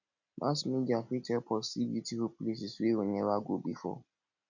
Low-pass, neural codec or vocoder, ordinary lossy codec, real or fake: 7.2 kHz; none; none; real